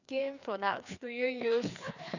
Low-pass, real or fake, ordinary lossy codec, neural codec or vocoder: 7.2 kHz; fake; none; codec, 16 kHz, 2 kbps, FreqCodec, larger model